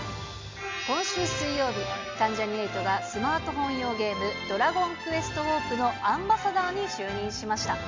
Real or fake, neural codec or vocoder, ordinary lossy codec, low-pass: real; none; MP3, 64 kbps; 7.2 kHz